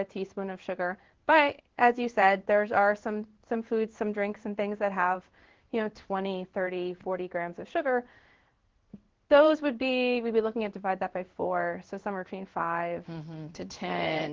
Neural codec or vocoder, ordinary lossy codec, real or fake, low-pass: codec, 16 kHz in and 24 kHz out, 1 kbps, XY-Tokenizer; Opus, 16 kbps; fake; 7.2 kHz